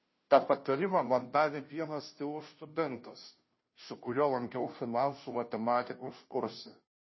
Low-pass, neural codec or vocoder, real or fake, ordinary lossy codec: 7.2 kHz; codec, 16 kHz, 0.5 kbps, FunCodec, trained on Chinese and English, 25 frames a second; fake; MP3, 24 kbps